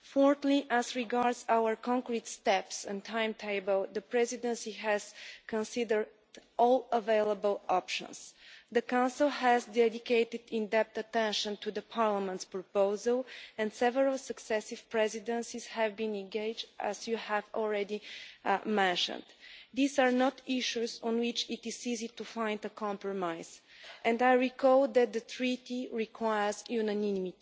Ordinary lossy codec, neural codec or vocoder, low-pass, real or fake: none; none; none; real